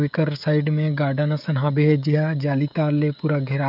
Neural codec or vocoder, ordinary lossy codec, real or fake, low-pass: codec, 16 kHz, 16 kbps, FreqCodec, smaller model; none; fake; 5.4 kHz